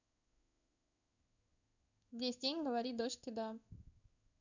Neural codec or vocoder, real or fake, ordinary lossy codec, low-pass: codec, 16 kHz in and 24 kHz out, 1 kbps, XY-Tokenizer; fake; none; 7.2 kHz